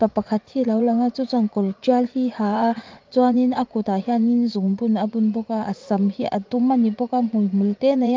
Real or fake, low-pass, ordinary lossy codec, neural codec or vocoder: fake; 7.2 kHz; Opus, 24 kbps; vocoder, 44.1 kHz, 80 mel bands, Vocos